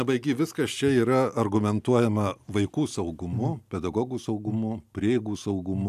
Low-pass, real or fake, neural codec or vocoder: 14.4 kHz; real; none